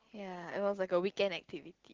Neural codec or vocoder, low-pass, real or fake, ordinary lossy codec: none; 7.2 kHz; real; Opus, 16 kbps